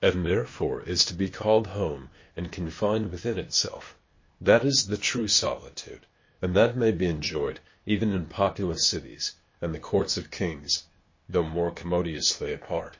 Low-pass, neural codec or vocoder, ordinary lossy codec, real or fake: 7.2 kHz; codec, 16 kHz, 0.8 kbps, ZipCodec; MP3, 32 kbps; fake